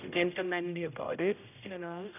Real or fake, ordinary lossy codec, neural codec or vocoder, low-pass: fake; none; codec, 16 kHz, 0.5 kbps, X-Codec, HuBERT features, trained on general audio; 3.6 kHz